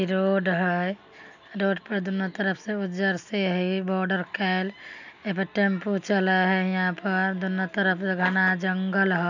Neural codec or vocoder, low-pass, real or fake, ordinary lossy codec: none; 7.2 kHz; real; none